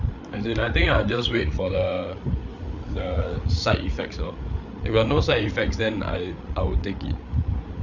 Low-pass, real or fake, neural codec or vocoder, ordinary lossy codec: 7.2 kHz; fake; codec, 16 kHz, 8 kbps, FreqCodec, larger model; none